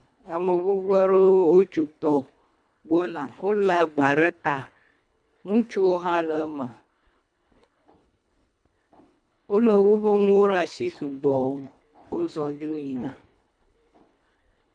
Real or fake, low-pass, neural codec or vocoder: fake; 9.9 kHz; codec, 24 kHz, 1.5 kbps, HILCodec